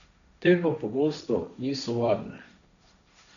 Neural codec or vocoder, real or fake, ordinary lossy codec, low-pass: codec, 16 kHz, 1.1 kbps, Voila-Tokenizer; fake; none; 7.2 kHz